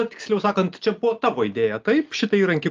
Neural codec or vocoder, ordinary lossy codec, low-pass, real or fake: none; Opus, 32 kbps; 7.2 kHz; real